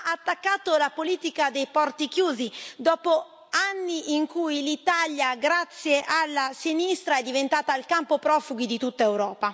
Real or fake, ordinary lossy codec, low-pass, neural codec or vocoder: real; none; none; none